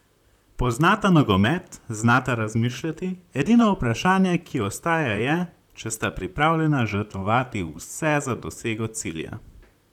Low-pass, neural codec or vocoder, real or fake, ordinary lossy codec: 19.8 kHz; vocoder, 44.1 kHz, 128 mel bands, Pupu-Vocoder; fake; none